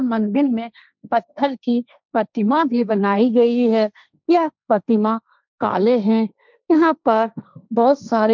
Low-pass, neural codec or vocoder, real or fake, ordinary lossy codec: 7.2 kHz; codec, 16 kHz, 1.1 kbps, Voila-Tokenizer; fake; none